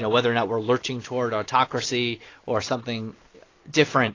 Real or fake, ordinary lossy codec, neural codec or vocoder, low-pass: real; AAC, 32 kbps; none; 7.2 kHz